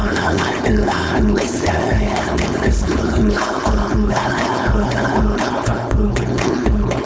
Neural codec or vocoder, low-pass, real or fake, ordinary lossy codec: codec, 16 kHz, 4.8 kbps, FACodec; none; fake; none